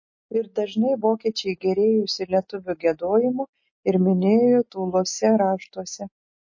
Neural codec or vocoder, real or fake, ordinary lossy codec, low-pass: none; real; MP3, 32 kbps; 7.2 kHz